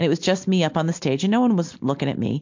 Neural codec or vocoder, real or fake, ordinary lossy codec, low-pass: none; real; MP3, 48 kbps; 7.2 kHz